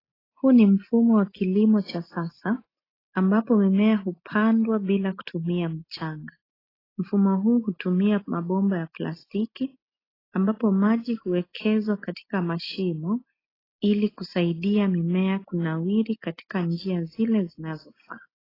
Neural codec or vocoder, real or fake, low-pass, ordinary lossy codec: none; real; 5.4 kHz; AAC, 24 kbps